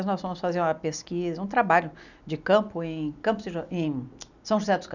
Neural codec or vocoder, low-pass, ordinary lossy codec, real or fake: none; 7.2 kHz; none; real